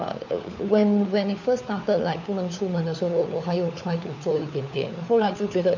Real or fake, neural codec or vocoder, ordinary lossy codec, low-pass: fake; codec, 16 kHz, 4 kbps, FunCodec, trained on LibriTTS, 50 frames a second; none; 7.2 kHz